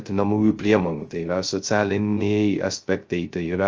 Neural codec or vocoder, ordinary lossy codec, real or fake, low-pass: codec, 16 kHz, 0.2 kbps, FocalCodec; Opus, 24 kbps; fake; 7.2 kHz